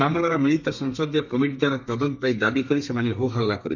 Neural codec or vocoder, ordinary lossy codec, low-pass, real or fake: codec, 32 kHz, 1.9 kbps, SNAC; none; 7.2 kHz; fake